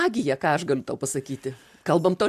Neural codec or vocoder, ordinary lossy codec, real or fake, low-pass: none; MP3, 96 kbps; real; 14.4 kHz